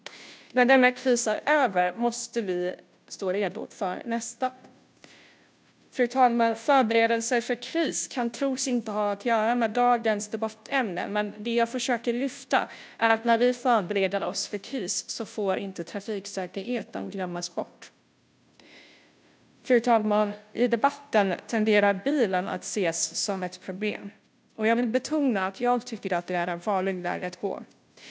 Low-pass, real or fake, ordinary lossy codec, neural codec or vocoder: none; fake; none; codec, 16 kHz, 0.5 kbps, FunCodec, trained on Chinese and English, 25 frames a second